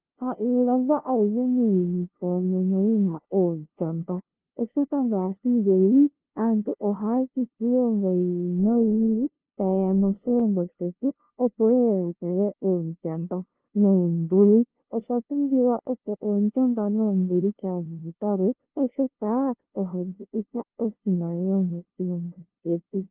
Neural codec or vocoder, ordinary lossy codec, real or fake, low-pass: codec, 16 kHz, 0.5 kbps, FunCodec, trained on LibriTTS, 25 frames a second; Opus, 16 kbps; fake; 3.6 kHz